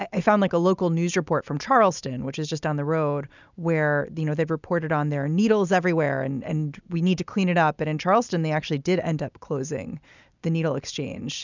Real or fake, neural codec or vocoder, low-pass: real; none; 7.2 kHz